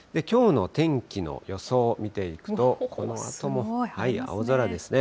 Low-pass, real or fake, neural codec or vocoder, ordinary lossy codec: none; real; none; none